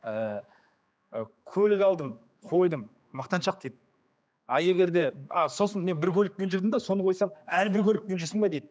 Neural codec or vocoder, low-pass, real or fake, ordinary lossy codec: codec, 16 kHz, 2 kbps, X-Codec, HuBERT features, trained on general audio; none; fake; none